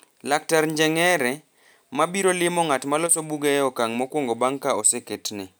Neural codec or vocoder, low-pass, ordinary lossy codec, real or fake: none; none; none; real